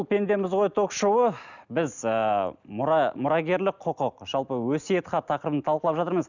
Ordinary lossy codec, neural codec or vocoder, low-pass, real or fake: none; none; 7.2 kHz; real